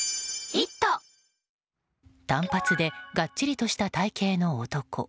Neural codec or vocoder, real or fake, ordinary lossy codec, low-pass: none; real; none; none